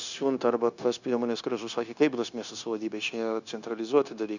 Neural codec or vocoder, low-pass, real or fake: codec, 16 kHz, 0.9 kbps, LongCat-Audio-Codec; 7.2 kHz; fake